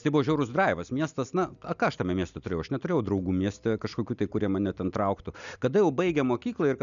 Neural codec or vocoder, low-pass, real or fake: none; 7.2 kHz; real